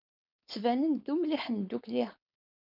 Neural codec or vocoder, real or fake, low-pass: codec, 16 kHz, 4.8 kbps, FACodec; fake; 5.4 kHz